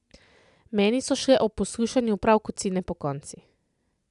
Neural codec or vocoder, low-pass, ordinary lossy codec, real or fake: none; 10.8 kHz; none; real